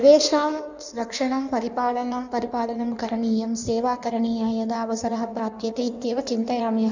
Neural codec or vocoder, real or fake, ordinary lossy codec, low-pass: codec, 16 kHz in and 24 kHz out, 1.1 kbps, FireRedTTS-2 codec; fake; none; 7.2 kHz